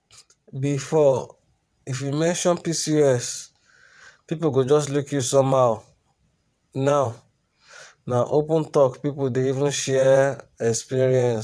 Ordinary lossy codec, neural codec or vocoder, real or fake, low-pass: none; vocoder, 22.05 kHz, 80 mel bands, WaveNeXt; fake; none